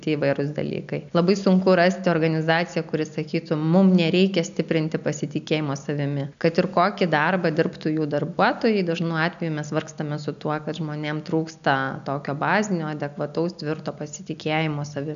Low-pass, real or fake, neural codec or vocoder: 7.2 kHz; real; none